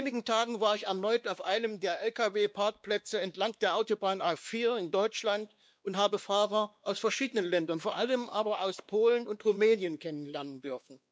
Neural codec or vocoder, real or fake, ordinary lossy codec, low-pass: codec, 16 kHz, 2 kbps, X-Codec, WavLM features, trained on Multilingual LibriSpeech; fake; none; none